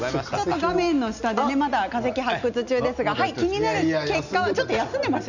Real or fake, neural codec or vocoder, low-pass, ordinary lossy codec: real; none; 7.2 kHz; none